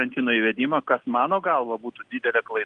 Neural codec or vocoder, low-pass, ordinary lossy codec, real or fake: none; 9.9 kHz; Opus, 24 kbps; real